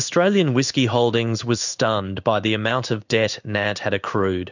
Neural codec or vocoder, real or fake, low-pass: codec, 16 kHz in and 24 kHz out, 1 kbps, XY-Tokenizer; fake; 7.2 kHz